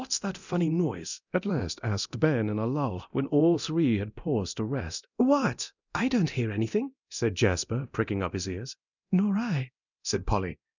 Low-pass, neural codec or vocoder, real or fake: 7.2 kHz; codec, 24 kHz, 0.9 kbps, DualCodec; fake